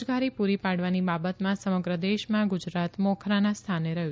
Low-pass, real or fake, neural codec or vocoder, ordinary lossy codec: none; real; none; none